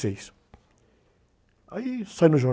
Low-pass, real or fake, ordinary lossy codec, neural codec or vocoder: none; real; none; none